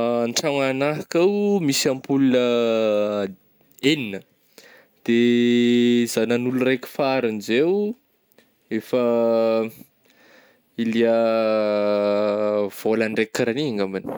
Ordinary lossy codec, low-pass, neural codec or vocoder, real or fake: none; none; none; real